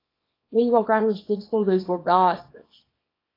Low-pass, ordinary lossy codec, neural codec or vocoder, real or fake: 5.4 kHz; AAC, 24 kbps; codec, 24 kHz, 0.9 kbps, WavTokenizer, small release; fake